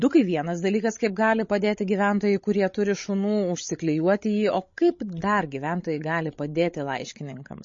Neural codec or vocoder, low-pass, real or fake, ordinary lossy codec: codec, 16 kHz, 16 kbps, FreqCodec, larger model; 7.2 kHz; fake; MP3, 32 kbps